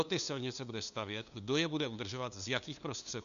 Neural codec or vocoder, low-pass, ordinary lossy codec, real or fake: codec, 16 kHz, 2 kbps, FunCodec, trained on LibriTTS, 25 frames a second; 7.2 kHz; MP3, 96 kbps; fake